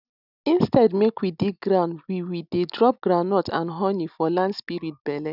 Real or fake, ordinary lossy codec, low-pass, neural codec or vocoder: real; none; 5.4 kHz; none